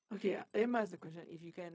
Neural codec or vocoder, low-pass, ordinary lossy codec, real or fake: codec, 16 kHz, 0.4 kbps, LongCat-Audio-Codec; none; none; fake